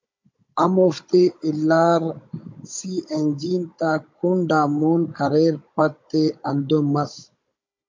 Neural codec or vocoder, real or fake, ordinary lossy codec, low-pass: codec, 16 kHz, 16 kbps, FunCodec, trained on Chinese and English, 50 frames a second; fake; MP3, 48 kbps; 7.2 kHz